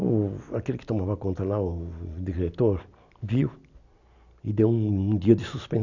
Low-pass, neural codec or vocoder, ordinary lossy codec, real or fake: 7.2 kHz; none; none; real